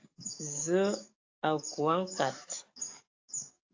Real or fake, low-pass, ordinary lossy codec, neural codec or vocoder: fake; 7.2 kHz; AAC, 48 kbps; codec, 44.1 kHz, 7.8 kbps, DAC